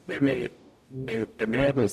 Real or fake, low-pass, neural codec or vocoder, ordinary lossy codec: fake; 14.4 kHz; codec, 44.1 kHz, 0.9 kbps, DAC; none